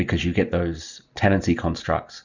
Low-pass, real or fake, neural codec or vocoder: 7.2 kHz; real; none